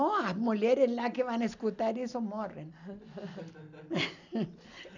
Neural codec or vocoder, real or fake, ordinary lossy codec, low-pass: none; real; none; 7.2 kHz